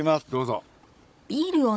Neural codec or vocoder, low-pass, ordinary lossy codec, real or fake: codec, 16 kHz, 16 kbps, FunCodec, trained on Chinese and English, 50 frames a second; none; none; fake